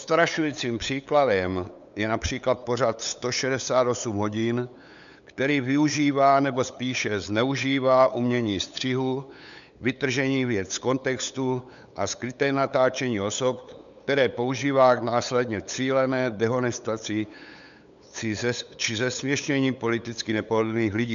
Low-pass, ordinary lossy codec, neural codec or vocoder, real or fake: 7.2 kHz; MP3, 96 kbps; codec, 16 kHz, 8 kbps, FunCodec, trained on LibriTTS, 25 frames a second; fake